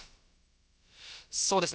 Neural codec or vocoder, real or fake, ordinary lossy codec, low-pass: codec, 16 kHz, about 1 kbps, DyCAST, with the encoder's durations; fake; none; none